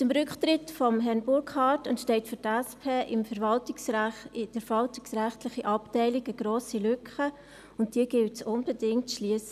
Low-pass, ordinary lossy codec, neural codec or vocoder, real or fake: 14.4 kHz; none; none; real